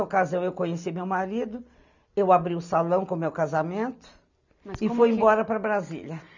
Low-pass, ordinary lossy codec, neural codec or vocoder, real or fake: 7.2 kHz; none; none; real